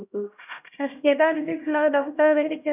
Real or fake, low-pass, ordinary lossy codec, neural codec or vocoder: fake; 3.6 kHz; none; codec, 16 kHz, 0.5 kbps, X-Codec, HuBERT features, trained on LibriSpeech